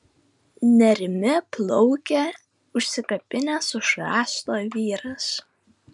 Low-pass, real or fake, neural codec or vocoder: 10.8 kHz; real; none